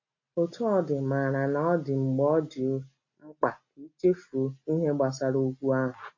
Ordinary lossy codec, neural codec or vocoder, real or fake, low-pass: MP3, 32 kbps; none; real; 7.2 kHz